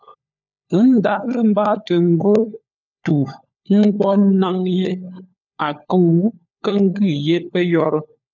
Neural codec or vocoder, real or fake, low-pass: codec, 16 kHz, 4 kbps, FunCodec, trained on LibriTTS, 50 frames a second; fake; 7.2 kHz